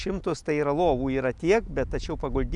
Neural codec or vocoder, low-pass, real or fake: none; 10.8 kHz; real